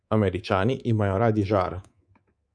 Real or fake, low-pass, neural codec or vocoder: fake; 9.9 kHz; codec, 24 kHz, 3.1 kbps, DualCodec